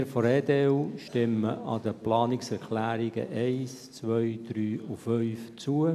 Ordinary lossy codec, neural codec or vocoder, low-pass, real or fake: none; none; 14.4 kHz; real